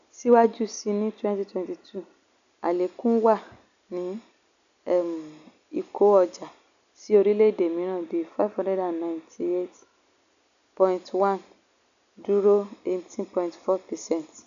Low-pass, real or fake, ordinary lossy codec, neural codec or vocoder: 7.2 kHz; real; none; none